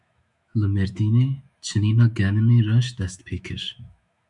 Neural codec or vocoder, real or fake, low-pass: autoencoder, 48 kHz, 128 numbers a frame, DAC-VAE, trained on Japanese speech; fake; 10.8 kHz